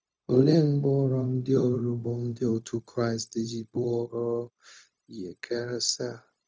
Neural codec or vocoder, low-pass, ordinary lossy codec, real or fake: codec, 16 kHz, 0.4 kbps, LongCat-Audio-Codec; none; none; fake